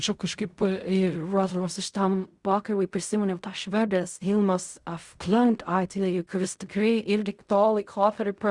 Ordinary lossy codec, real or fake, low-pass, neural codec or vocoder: Opus, 64 kbps; fake; 10.8 kHz; codec, 16 kHz in and 24 kHz out, 0.4 kbps, LongCat-Audio-Codec, fine tuned four codebook decoder